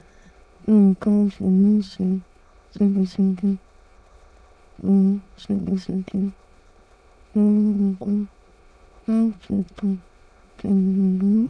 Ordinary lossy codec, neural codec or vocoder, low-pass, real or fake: none; autoencoder, 22.05 kHz, a latent of 192 numbers a frame, VITS, trained on many speakers; none; fake